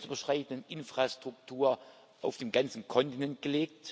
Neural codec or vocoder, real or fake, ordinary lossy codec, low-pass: none; real; none; none